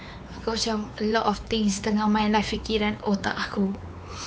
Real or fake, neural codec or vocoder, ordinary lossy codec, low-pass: fake; codec, 16 kHz, 4 kbps, X-Codec, WavLM features, trained on Multilingual LibriSpeech; none; none